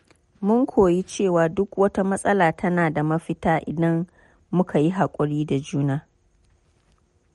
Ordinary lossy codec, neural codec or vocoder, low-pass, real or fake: MP3, 48 kbps; none; 19.8 kHz; real